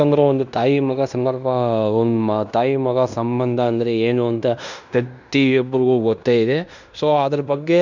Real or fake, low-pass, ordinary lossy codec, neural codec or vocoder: fake; 7.2 kHz; none; codec, 16 kHz in and 24 kHz out, 0.9 kbps, LongCat-Audio-Codec, fine tuned four codebook decoder